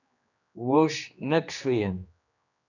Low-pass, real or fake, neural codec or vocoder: 7.2 kHz; fake; codec, 16 kHz, 2 kbps, X-Codec, HuBERT features, trained on general audio